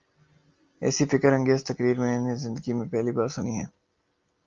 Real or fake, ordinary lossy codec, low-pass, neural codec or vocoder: real; Opus, 32 kbps; 7.2 kHz; none